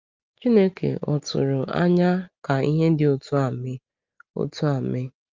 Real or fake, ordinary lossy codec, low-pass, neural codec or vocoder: real; Opus, 32 kbps; 7.2 kHz; none